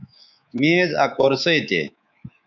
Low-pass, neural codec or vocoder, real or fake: 7.2 kHz; codec, 24 kHz, 3.1 kbps, DualCodec; fake